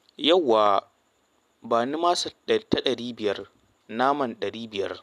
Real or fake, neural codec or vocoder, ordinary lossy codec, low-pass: real; none; none; 14.4 kHz